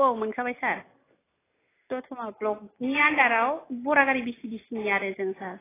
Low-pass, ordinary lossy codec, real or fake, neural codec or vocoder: 3.6 kHz; AAC, 16 kbps; real; none